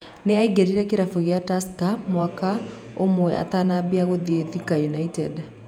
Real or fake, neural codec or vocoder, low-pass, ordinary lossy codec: fake; vocoder, 48 kHz, 128 mel bands, Vocos; 19.8 kHz; none